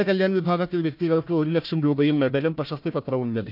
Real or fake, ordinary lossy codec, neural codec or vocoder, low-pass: fake; none; codec, 16 kHz, 1 kbps, FunCodec, trained on Chinese and English, 50 frames a second; 5.4 kHz